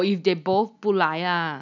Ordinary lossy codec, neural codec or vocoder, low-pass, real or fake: none; none; 7.2 kHz; real